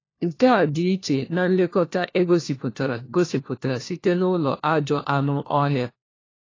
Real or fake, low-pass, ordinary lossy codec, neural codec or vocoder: fake; 7.2 kHz; AAC, 32 kbps; codec, 16 kHz, 1 kbps, FunCodec, trained on LibriTTS, 50 frames a second